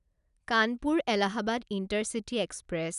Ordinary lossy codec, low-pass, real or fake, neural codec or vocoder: none; 10.8 kHz; real; none